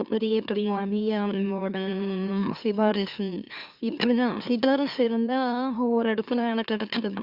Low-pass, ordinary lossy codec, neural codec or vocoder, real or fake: 5.4 kHz; Opus, 64 kbps; autoencoder, 44.1 kHz, a latent of 192 numbers a frame, MeloTTS; fake